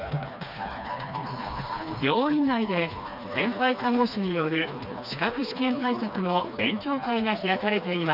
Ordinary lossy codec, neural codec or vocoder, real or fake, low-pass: none; codec, 16 kHz, 2 kbps, FreqCodec, smaller model; fake; 5.4 kHz